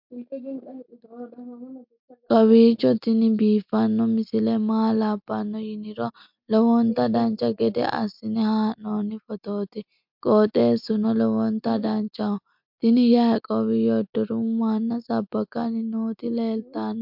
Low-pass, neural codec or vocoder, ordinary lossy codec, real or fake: 5.4 kHz; none; MP3, 48 kbps; real